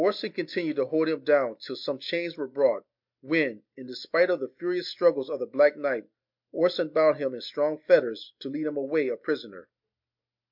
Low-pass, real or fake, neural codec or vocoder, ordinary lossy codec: 5.4 kHz; real; none; MP3, 48 kbps